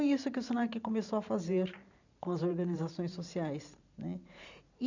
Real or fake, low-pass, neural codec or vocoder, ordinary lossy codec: fake; 7.2 kHz; vocoder, 44.1 kHz, 128 mel bands every 512 samples, BigVGAN v2; none